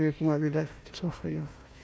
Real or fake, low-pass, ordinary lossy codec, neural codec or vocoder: fake; none; none; codec, 16 kHz, 1 kbps, FunCodec, trained on Chinese and English, 50 frames a second